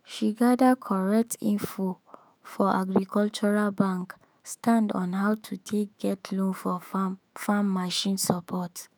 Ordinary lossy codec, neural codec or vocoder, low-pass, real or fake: none; autoencoder, 48 kHz, 128 numbers a frame, DAC-VAE, trained on Japanese speech; none; fake